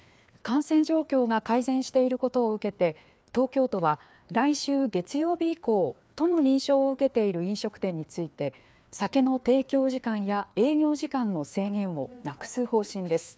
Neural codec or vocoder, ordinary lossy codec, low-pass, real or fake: codec, 16 kHz, 2 kbps, FreqCodec, larger model; none; none; fake